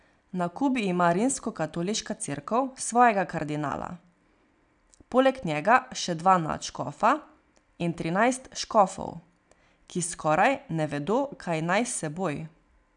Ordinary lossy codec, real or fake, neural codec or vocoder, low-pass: none; real; none; 9.9 kHz